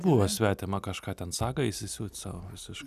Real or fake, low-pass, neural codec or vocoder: real; 14.4 kHz; none